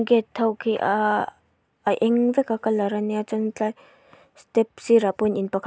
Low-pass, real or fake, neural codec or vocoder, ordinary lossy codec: none; real; none; none